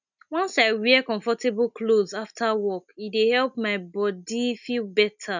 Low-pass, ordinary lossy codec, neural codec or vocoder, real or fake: 7.2 kHz; none; none; real